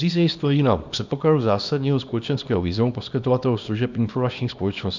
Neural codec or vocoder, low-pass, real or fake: codec, 24 kHz, 0.9 kbps, WavTokenizer, small release; 7.2 kHz; fake